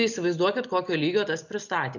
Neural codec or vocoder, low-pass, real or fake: none; 7.2 kHz; real